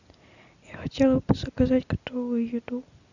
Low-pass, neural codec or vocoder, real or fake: 7.2 kHz; none; real